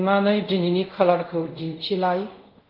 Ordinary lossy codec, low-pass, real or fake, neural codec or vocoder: Opus, 24 kbps; 5.4 kHz; fake; codec, 24 kHz, 0.5 kbps, DualCodec